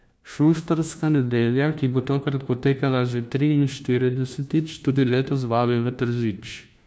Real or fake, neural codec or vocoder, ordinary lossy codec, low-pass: fake; codec, 16 kHz, 1 kbps, FunCodec, trained on LibriTTS, 50 frames a second; none; none